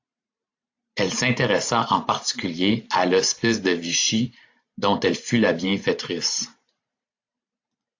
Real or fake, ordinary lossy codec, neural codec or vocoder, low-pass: fake; AAC, 48 kbps; vocoder, 44.1 kHz, 128 mel bands every 512 samples, BigVGAN v2; 7.2 kHz